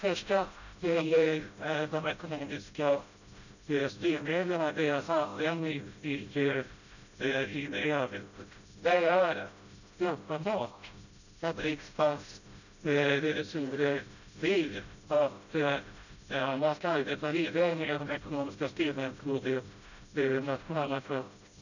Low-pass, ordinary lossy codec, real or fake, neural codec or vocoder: 7.2 kHz; none; fake; codec, 16 kHz, 0.5 kbps, FreqCodec, smaller model